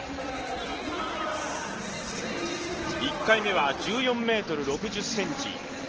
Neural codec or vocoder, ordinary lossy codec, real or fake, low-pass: none; Opus, 16 kbps; real; 7.2 kHz